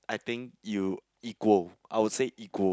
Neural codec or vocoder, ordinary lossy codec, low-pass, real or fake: none; none; none; real